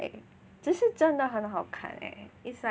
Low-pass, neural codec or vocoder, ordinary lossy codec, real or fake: none; none; none; real